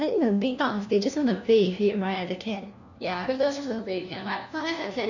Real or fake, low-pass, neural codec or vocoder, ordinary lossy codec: fake; 7.2 kHz; codec, 16 kHz, 1 kbps, FunCodec, trained on LibriTTS, 50 frames a second; none